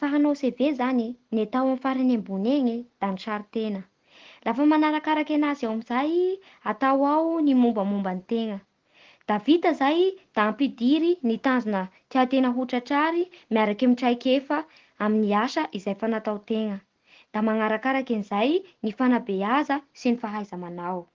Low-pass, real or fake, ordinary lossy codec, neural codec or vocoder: 7.2 kHz; real; Opus, 16 kbps; none